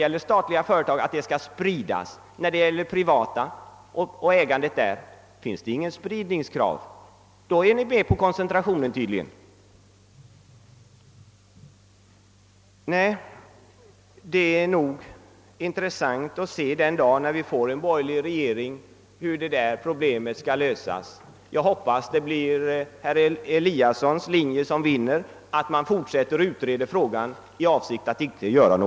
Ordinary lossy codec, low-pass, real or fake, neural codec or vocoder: none; none; real; none